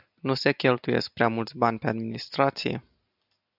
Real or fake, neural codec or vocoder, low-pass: real; none; 5.4 kHz